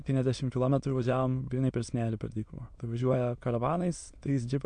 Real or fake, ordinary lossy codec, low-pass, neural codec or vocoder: fake; MP3, 64 kbps; 9.9 kHz; autoencoder, 22.05 kHz, a latent of 192 numbers a frame, VITS, trained on many speakers